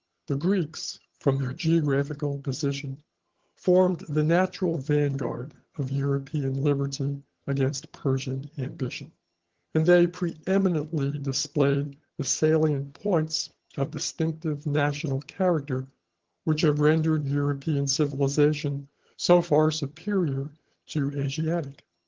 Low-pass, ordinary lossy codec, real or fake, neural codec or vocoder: 7.2 kHz; Opus, 16 kbps; fake; vocoder, 22.05 kHz, 80 mel bands, HiFi-GAN